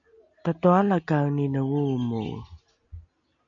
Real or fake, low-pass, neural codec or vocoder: real; 7.2 kHz; none